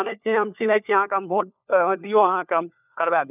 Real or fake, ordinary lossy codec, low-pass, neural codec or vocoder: fake; none; 3.6 kHz; codec, 16 kHz, 4 kbps, FunCodec, trained on LibriTTS, 50 frames a second